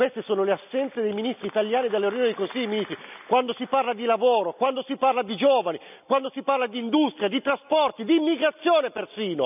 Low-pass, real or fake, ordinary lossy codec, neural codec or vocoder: 3.6 kHz; real; none; none